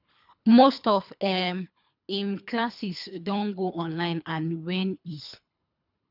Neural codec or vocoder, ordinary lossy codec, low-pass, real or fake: codec, 24 kHz, 3 kbps, HILCodec; none; 5.4 kHz; fake